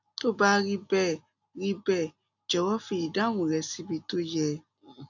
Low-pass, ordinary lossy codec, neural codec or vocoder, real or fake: 7.2 kHz; none; none; real